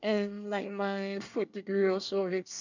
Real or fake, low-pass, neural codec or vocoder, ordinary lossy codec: fake; 7.2 kHz; codec, 24 kHz, 1 kbps, SNAC; none